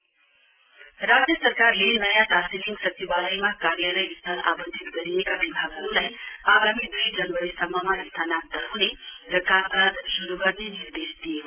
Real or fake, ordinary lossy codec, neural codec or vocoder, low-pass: real; Opus, 32 kbps; none; 3.6 kHz